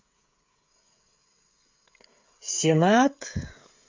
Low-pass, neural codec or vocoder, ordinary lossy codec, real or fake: 7.2 kHz; codec, 16 kHz, 16 kbps, FreqCodec, smaller model; MP3, 48 kbps; fake